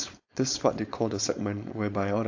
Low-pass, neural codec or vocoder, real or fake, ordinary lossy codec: 7.2 kHz; codec, 16 kHz, 4.8 kbps, FACodec; fake; AAC, 48 kbps